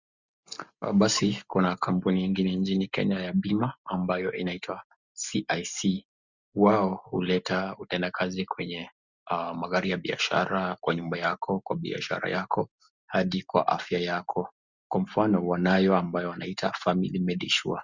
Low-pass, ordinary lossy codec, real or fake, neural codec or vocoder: 7.2 kHz; Opus, 64 kbps; real; none